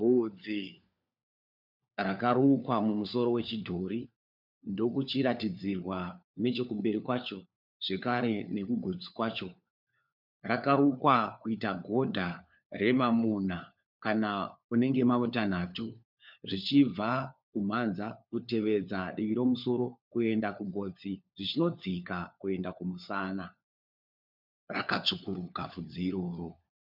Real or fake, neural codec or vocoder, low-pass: fake; codec, 16 kHz, 4 kbps, FunCodec, trained on LibriTTS, 50 frames a second; 5.4 kHz